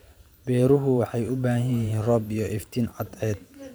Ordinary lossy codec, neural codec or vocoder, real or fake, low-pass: none; vocoder, 44.1 kHz, 128 mel bands every 512 samples, BigVGAN v2; fake; none